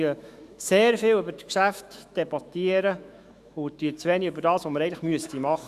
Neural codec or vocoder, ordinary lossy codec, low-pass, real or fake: autoencoder, 48 kHz, 128 numbers a frame, DAC-VAE, trained on Japanese speech; Opus, 64 kbps; 14.4 kHz; fake